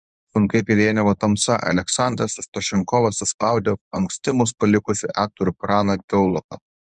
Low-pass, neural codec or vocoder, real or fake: 10.8 kHz; codec, 24 kHz, 0.9 kbps, WavTokenizer, medium speech release version 1; fake